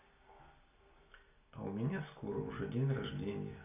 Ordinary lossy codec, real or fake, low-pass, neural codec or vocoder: none; real; 3.6 kHz; none